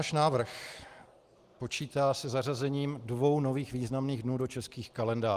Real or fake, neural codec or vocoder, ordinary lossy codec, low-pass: real; none; Opus, 24 kbps; 14.4 kHz